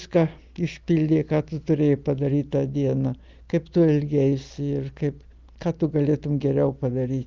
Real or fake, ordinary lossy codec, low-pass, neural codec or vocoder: real; Opus, 32 kbps; 7.2 kHz; none